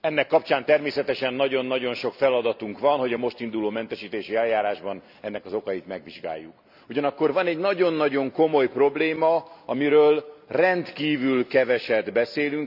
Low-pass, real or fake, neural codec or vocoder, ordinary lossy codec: 5.4 kHz; real; none; none